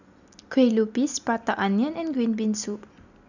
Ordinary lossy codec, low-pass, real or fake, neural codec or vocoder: none; 7.2 kHz; real; none